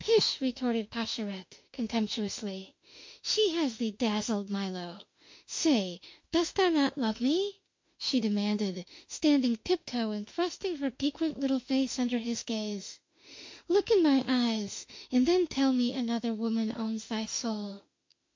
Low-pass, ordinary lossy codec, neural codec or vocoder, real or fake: 7.2 kHz; MP3, 48 kbps; autoencoder, 48 kHz, 32 numbers a frame, DAC-VAE, trained on Japanese speech; fake